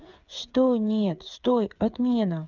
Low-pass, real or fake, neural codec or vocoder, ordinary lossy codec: 7.2 kHz; fake; codec, 16 kHz, 8 kbps, FreqCodec, smaller model; none